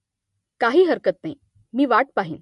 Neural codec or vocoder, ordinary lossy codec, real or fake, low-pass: none; MP3, 48 kbps; real; 14.4 kHz